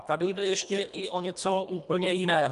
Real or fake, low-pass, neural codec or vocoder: fake; 10.8 kHz; codec, 24 kHz, 1.5 kbps, HILCodec